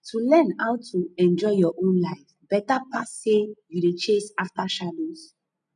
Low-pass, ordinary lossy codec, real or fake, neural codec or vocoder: 9.9 kHz; none; real; none